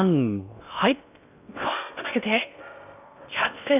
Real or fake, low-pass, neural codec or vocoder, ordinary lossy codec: fake; 3.6 kHz; codec, 16 kHz in and 24 kHz out, 0.6 kbps, FocalCodec, streaming, 4096 codes; none